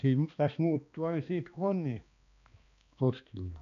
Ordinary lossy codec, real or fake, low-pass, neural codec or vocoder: none; fake; 7.2 kHz; codec, 16 kHz, 2 kbps, X-Codec, HuBERT features, trained on balanced general audio